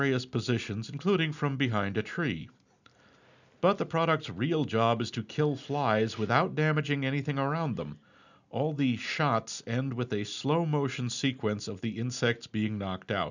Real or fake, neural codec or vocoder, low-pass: real; none; 7.2 kHz